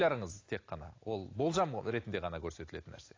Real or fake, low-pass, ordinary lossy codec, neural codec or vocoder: real; 7.2 kHz; AAC, 32 kbps; none